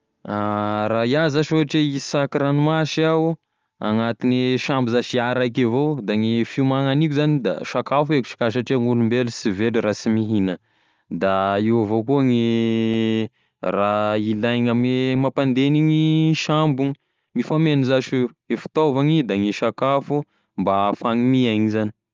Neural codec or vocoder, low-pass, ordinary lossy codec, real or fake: none; 7.2 kHz; Opus, 32 kbps; real